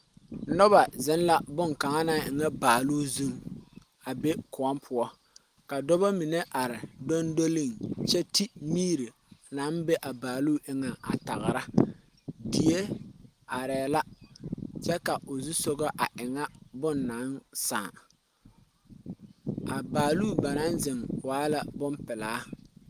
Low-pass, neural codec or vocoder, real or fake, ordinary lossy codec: 14.4 kHz; none; real; Opus, 32 kbps